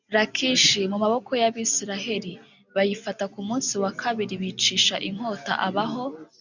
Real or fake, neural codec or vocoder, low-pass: real; none; 7.2 kHz